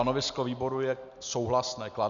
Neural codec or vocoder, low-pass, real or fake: none; 7.2 kHz; real